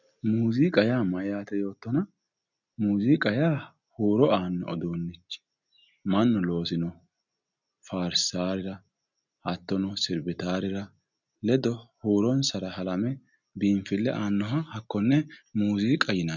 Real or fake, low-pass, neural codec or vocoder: real; 7.2 kHz; none